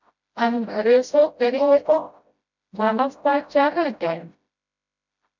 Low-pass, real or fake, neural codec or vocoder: 7.2 kHz; fake; codec, 16 kHz, 0.5 kbps, FreqCodec, smaller model